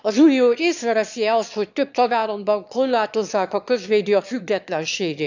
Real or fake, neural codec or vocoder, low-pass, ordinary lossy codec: fake; autoencoder, 22.05 kHz, a latent of 192 numbers a frame, VITS, trained on one speaker; 7.2 kHz; none